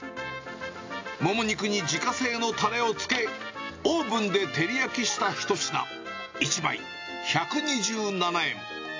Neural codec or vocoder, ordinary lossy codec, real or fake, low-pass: none; AAC, 48 kbps; real; 7.2 kHz